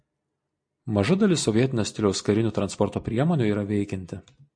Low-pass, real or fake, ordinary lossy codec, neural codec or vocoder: 9.9 kHz; real; MP3, 48 kbps; none